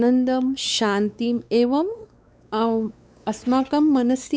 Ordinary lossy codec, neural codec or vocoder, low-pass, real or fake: none; codec, 16 kHz, 4 kbps, X-Codec, WavLM features, trained on Multilingual LibriSpeech; none; fake